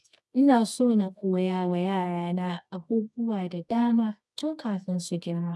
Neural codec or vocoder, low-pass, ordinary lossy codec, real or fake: codec, 24 kHz, 0.9 kbps, WavTokenizer, medium music audio release; none; none; fake